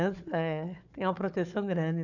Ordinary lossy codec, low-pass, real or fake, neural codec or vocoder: none; 7.2 kHz; fake; codec, 16 kHz, 4 kbps, FunCodec, trained on Chinese and English, 50 frames a second